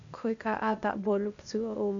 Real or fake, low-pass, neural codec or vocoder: fake; 7.2 kHz; codec, 16 kHz, 0.8 kbps, ZipCodec